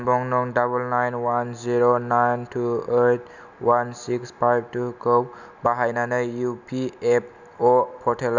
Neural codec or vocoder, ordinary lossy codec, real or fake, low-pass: none; none; real; 7.2 kHz